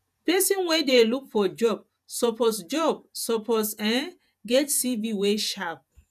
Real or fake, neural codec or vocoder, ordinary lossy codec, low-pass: fake; vocoder, 48 kHz, 128 mel bands, Vocos; none; 14.4 kHz